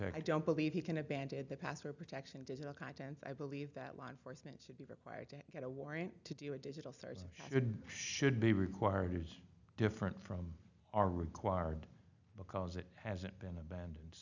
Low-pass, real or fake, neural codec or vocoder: 7.2 kHz; real; none